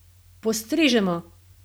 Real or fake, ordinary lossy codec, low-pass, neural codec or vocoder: real; none; none; none